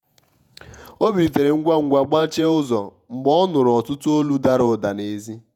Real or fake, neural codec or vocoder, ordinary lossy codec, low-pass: fake; vocoder, 48 kHz, 128 mel bands, Vocos; none; 19.8 kHz